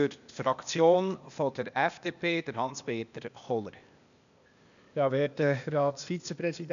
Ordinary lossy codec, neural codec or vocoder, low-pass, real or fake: none; codec, 16 kHz, 0.8 kbps, ZipCodec; 7.2 kHz; fake